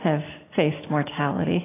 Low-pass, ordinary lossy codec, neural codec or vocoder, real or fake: 3.6 kHz; AAC, 16 kbps; none; real